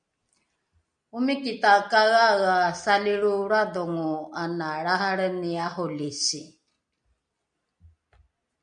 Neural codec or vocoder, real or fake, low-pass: none; real; 9.9 kHz